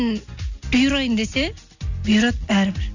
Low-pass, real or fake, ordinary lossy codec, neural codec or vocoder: 7.2 kHz; real; none; none